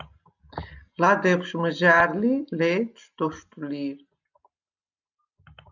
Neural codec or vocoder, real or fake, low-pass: none; real; 7.2 kHz